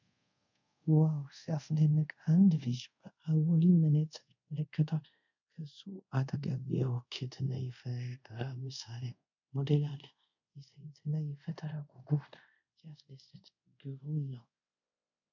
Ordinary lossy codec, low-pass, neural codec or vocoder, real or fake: MP3, 64 kbps; 7.2 kHz; codec, 24 kHz, 0.5 kbps, DualCodec; fake